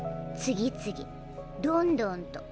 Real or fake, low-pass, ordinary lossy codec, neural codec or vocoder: real; none; none; none